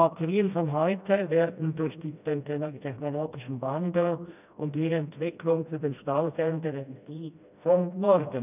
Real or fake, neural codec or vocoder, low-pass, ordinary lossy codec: fake; codec, 16 kHz, 1 kbps, FreqCodec, smaller model; 3.6 kHz; none